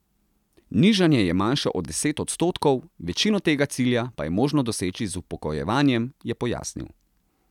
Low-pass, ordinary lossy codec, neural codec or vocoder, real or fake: 19.8 kHz; none; none; real